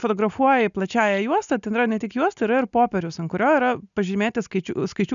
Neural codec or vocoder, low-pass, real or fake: none; 7.2 kHz; real